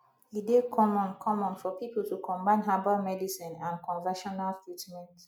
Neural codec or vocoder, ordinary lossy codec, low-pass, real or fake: none; none; 19.8 kHz; real